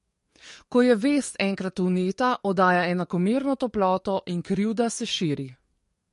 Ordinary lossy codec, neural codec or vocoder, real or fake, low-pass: MP3, 48 kbps; codec, 44.1 kHz, 7.8 kbps, DAC; fake; 14.4 kHz